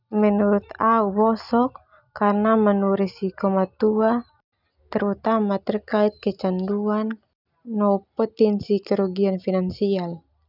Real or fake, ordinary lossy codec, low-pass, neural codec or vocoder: real; none; 5.4 kHz; none